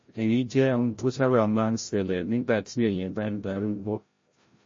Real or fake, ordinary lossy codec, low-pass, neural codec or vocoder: fake; MP3, 32 kbps; 7.2 kHz; codec, 16 kHz, 0.5 kbps, FreqCodec, larger model